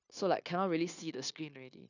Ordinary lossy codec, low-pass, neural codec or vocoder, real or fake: none; 7.2 kHz; codec, 16 kHz, 0.9 kbps, LongCat-Audio-Codec; fake